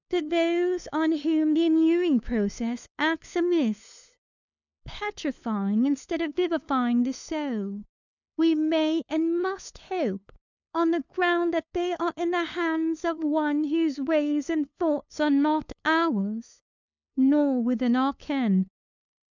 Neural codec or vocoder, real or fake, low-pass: codec, 16 kHz, 2 kbps, FunCodec, trained on LibriTTS, 25 frames a second; fake; 7.2 kHz